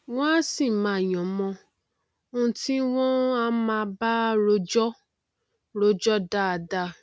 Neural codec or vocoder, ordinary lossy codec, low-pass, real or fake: none; none; none; real